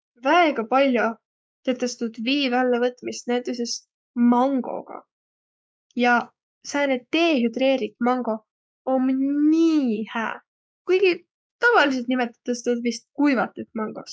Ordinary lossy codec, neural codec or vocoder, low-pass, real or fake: none; codec, 16 kHz, 6 kbps, DAC; none; fake